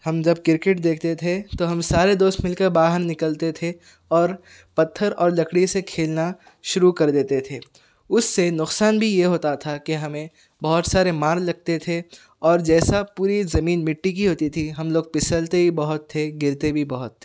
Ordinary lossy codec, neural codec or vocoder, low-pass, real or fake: none; none; none; real